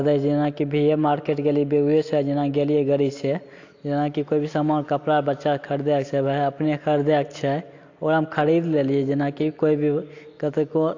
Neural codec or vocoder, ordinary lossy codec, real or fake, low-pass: none; AAC, 48 kbps; real; 7.2 kHz